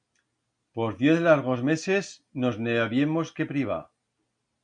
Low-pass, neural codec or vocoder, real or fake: 9.9 kHz; none; real